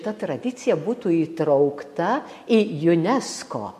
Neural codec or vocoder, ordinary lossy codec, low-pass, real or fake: none; MP3, 64 kbps; 14.4 kHz; real